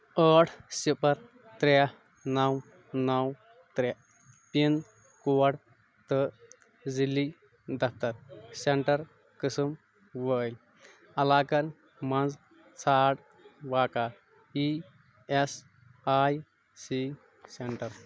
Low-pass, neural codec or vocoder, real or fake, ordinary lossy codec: none; none; real; none